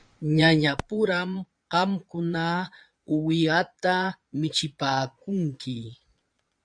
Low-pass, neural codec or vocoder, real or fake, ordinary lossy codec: 9.9 kHz; vocoder, 22.05 kHz, 80 mel bands, Vocos; fake; MP3, 64 kbps